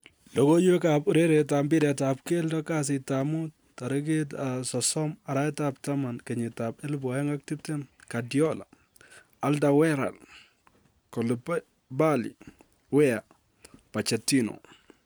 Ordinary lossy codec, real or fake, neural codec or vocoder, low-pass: none; real; none; none